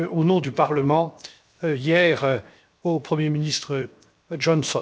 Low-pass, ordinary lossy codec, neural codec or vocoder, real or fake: none; none; codec, 16 kHz, 0.7 kbps, FocalCodec; fake